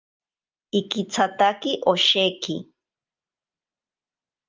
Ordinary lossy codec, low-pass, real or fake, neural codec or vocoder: Opus, 24 kbps; 7.2 kHz; real; none